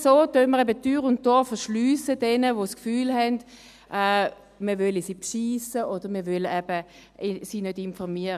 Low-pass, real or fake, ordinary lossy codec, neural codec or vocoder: 14.4 kHz; real; none; none